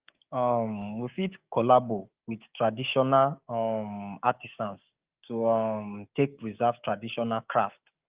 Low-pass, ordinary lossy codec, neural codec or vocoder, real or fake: 3.6 kHz; Opus, 32 kbps; codec, 16 kHz, 6 kbps, DAC; fake